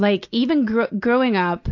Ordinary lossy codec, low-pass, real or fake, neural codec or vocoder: AAC, 48 kbps; 7.2 kHz; real; none